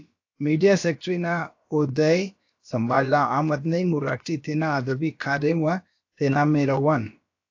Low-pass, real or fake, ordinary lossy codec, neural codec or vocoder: 7.2 kHz; fake; AAC, 48 kbps; codec, 16 kHz, about 1 kbps, DyCAST, with the encoder's durations